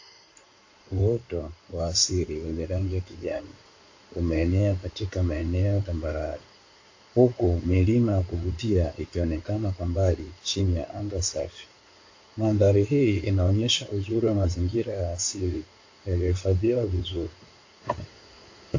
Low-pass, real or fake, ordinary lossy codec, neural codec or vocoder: 7.2 kHz; fake; AAC, 48 kbps; codec, 16 kHz in and 24 kHz out, 2.2 kbps, FireRedTTS-2 codec